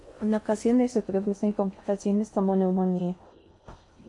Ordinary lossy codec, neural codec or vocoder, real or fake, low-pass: MP3, 48 kbps; codec, 16 kHz in and 24 kHz out, 0.6 kbps, FocalCodec, streaming, 4096 codes; fake; 10.8 kHz